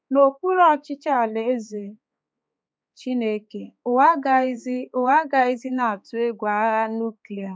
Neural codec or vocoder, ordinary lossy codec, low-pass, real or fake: codec, 16 kHz, 4 kbps, X-Codec, HuBERT features, trained on balanced general audio; none; none; fake